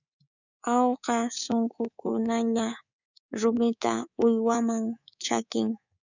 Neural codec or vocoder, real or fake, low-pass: codec, 24 kHz, 3.1 kbps, DualCodec; fake; 7.2 kHz